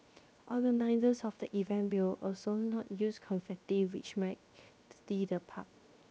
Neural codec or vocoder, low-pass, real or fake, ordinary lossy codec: codec, 16 kHz, 0.7 kbps, FocalCodec; none; fake; none